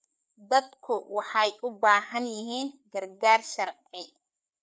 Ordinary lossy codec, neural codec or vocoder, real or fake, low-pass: none; codec, 16 kHz, 4 kbps, FreqCodec, larger model; fake; none